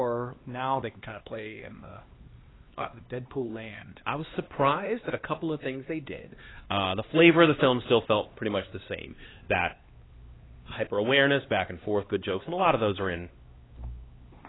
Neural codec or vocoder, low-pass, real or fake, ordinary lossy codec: codec, 16 kHz, 2 kbps, X-Codec, HuBERT features, trained on LibriSpeech; 7.2 kHz; fake; AAC, 16 kbps